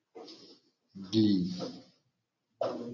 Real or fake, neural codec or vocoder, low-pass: real; none; 7.2 kHz